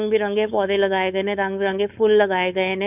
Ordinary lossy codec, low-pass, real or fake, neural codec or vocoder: none; 3.6 kHz; fake; codec, 16 kHz, 4.8 kbps, FACodec